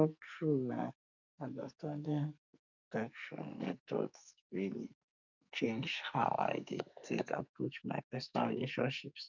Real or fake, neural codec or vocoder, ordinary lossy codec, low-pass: fake; codec, 44.1 kHz, 2.6 kbps, SNAC; none; 7.2 kHz